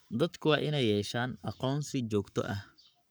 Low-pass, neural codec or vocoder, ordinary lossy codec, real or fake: none; codec, 44.1 kHz, 7.8 kbps, Pupu-Codec; none; fake